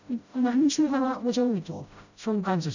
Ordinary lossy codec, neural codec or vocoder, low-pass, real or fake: none; codec, 16 kHz, 0.5 kbps, FreqCodec, smaller model; 7.2 kHz; fake